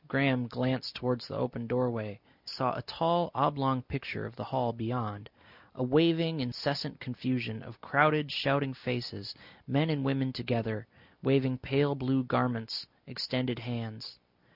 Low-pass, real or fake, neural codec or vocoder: 5.4 kHz; real; none